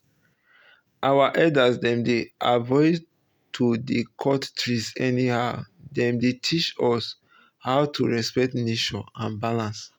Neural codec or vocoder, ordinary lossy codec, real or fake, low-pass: none; none; real; none